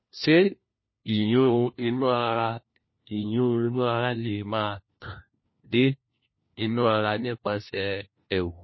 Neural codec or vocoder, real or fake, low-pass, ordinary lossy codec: codec, 16 kHz, 1 kbps, FunCodec, trained on LibriTTS, 50 frames a second; fake; 7.2 kHz; MP3, 24 kbps